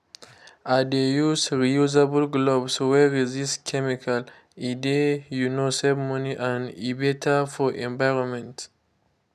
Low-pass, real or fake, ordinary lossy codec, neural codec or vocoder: 14.4 kHz; real; none; none